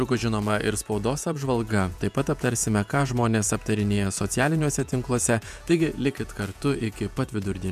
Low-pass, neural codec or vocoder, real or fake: 14.4 kHz; vocoder, 48 kHz, 128 mel bands, Vocos; fake